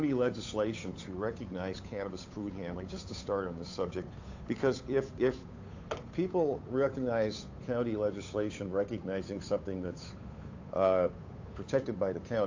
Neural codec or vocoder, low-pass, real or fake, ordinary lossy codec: codec, 16 kHz, 8 kbps, FunCodec, trained on Chinese and English, 25 frames a second; 7.2 kHz; fake; AAC, 48 kbps